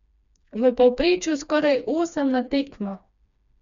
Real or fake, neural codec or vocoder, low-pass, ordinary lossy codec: fake; codec, 16 kHz, 2 kbps, FreqCodec, smaller model; 7.2 kHz; none